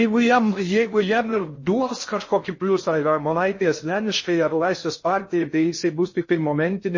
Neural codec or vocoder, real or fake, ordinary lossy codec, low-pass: codec, 16 kHz in and 24 kHz out, 0.6 kbps, FocalCodec, streaming, 4096 codes; fake; MP3, 32 kbps; 7.2 kHz